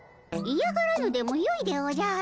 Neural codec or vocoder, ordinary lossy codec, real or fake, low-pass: none; none; real; none